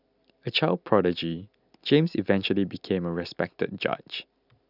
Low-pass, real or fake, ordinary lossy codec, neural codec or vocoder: 5.4 kHz; real; none; none